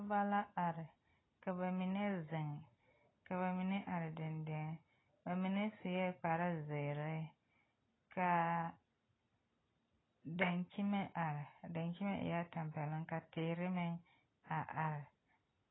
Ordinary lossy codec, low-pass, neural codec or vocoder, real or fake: AAC, 16 kbps; 7.2 kHz; none; real